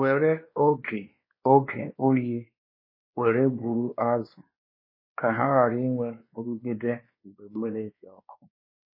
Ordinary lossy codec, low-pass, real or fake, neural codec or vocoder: MP3, 24 kbps; 5.4 kHz; fake; codec, 16 kHz, 2 kbps, X-Codec, WavLM features, trained on Multilingual LibriSpeech